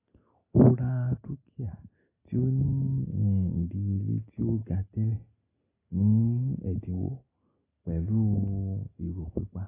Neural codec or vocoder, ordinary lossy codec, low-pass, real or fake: none; AAC, 16 kbps; 3.6 kHz; real